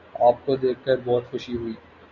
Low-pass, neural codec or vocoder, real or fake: 7.2 kHz; none; real